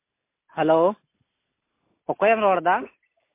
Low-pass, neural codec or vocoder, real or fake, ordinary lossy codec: 3.6 kHz; none; real; MP3, 24 kbps